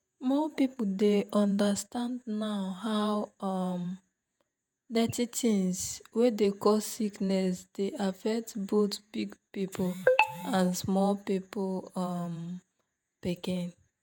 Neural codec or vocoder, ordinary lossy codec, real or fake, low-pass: vocoder, 48 kHz, 128 mel bands, Vocos; none; fake; none